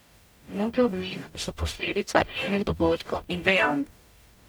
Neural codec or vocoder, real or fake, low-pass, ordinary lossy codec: codec, 44.1 kHz, 0.9 kbps, DAC; fake; none; none